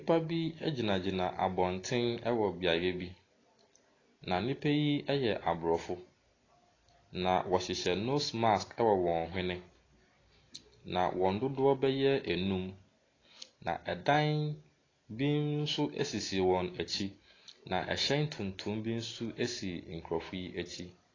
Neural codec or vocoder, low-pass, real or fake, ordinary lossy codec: none; 7.2 kHz; real; AAC, 32 kbps